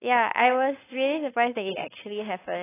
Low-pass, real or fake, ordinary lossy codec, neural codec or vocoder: 3.6 kHz; fake; AAC, 16 kbps; codec, 16 kHz, 4.8 kbps, FACodec